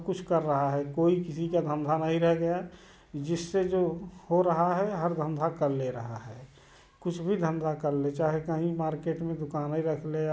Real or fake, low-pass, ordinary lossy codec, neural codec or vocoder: real; none; none; none